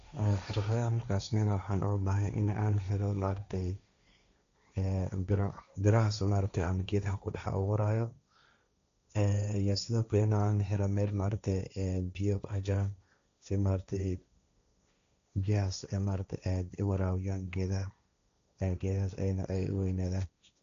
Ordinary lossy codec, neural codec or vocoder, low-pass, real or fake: AAC, 64 kbps; codec, 16 kHz, 1.1 kbps, Voila-Tokenizer; 7.2 kHz; fake